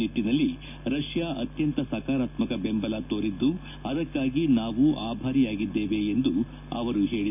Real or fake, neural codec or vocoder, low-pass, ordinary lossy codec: real; none; 3.6 kHz; none